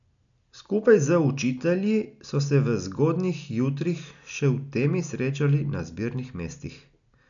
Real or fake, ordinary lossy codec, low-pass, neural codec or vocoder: real; none; 7.2 kHz; none